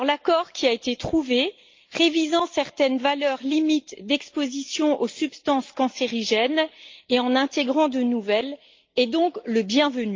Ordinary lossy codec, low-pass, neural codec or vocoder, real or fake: Opus, 24 kbps; 7.2 kHz; none; real